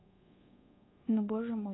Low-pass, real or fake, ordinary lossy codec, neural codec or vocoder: 7.2 kHz; fake; AAC, 16 kbps; codec, 24 kHz, 1.2 kbps, DualCodec